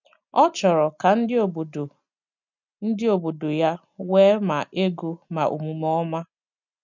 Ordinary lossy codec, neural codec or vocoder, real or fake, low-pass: none; none; real; 7.2 kHz